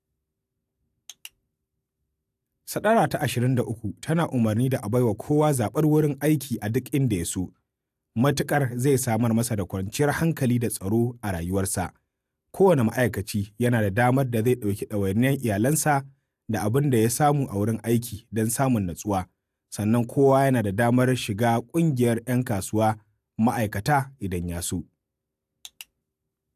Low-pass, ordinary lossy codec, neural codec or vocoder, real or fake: 14.4 kHz; AAC, 96 kbps; none; real